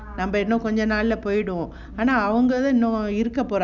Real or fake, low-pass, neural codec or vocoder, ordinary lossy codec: real; 7.2 kHz; none; none